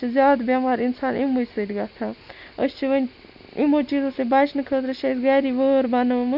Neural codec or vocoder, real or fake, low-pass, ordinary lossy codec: none; real; 5.4 kHz; none